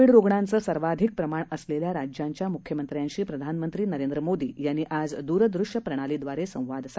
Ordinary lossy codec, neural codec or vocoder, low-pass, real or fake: none; none; none; real